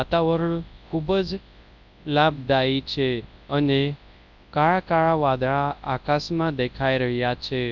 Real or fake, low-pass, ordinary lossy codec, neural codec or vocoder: fake; 7.2 kHz; none; codec, 24 kHz, 0.9 kbps, WavTokenizer, large speech release